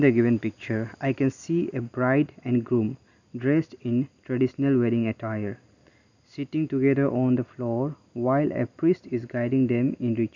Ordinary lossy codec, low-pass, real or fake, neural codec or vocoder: none; 7.2 kHz; real; none